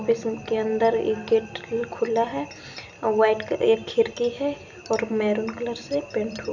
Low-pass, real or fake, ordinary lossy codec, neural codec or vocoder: 7.2 kHz; real; none; none